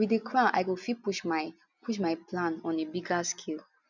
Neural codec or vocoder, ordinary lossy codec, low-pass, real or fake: none; none; 7.2 kHz; real